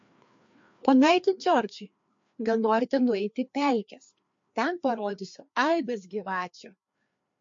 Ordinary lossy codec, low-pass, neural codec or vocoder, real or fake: MP3, 48 kbps; 7.2 kHz; codec, 16 kHz, 2 kbps, FreqCodec, larger model; fake